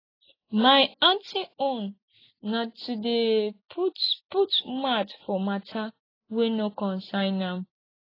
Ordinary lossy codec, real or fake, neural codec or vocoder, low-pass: AAC, 24 kbps; real; none; 5.4 kHz